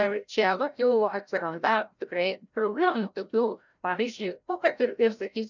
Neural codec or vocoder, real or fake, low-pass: codec, 16 kHz, 0.5 kbps, FreqCodec, larger model; fake; 7.2 kHz